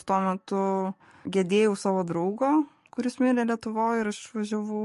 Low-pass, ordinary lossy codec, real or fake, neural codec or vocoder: 14.4 kHz; MP3, 48 kbps; fake; codec, 44.1 kHz, 7.8 kbps, DAC